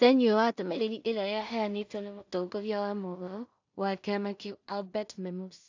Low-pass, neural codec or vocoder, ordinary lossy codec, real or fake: 7.2 kHz; codec, 16 kHz in and 24 kHz out, 0.4 kbps, LongCat-Audio-Codec, two codebook decoder; AAC, 48 kbps; fake